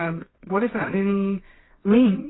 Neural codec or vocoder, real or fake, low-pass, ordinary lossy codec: codec, 24 kHz, 0.9 kbps, WavTokenizer, medium music audio release; fake; 7.2 kHz; AAC, 16 kbps